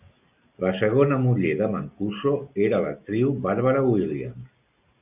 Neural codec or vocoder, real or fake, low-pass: none; real; 3.6 kHz